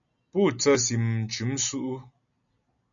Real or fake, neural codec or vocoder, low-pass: real; none; 7.2 kHz